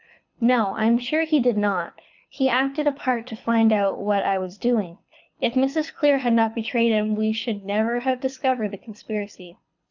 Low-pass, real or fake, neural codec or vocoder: 7.2 kHz; fake; codec, 24 kHz, 6 kbps, HILCodec